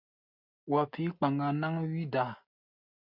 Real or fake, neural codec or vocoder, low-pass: real; none; 5.4 kHz